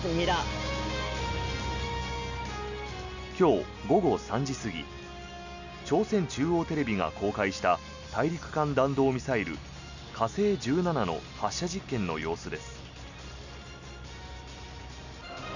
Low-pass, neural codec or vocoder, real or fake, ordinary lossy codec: 7.2 kHz; none; real; none